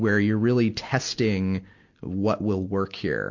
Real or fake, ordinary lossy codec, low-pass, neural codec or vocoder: real; MP3, 48 kbps; 7.2 kHz; none